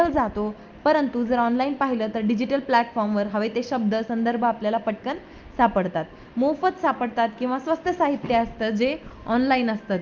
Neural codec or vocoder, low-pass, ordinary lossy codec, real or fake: none; 7.2 kHz; Opus, 24 kbps; real